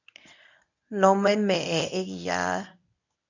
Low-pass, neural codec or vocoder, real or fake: 7.2 kHz; codec, 24 kHz, 0.9 kbps, WavTokenizer, medium speech release version 1; fake